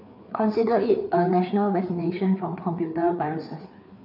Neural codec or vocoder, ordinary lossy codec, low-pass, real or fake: codec, 16 kHz, 4 kbps, FreqCodec, larger model; none; 5.4 kHz; fake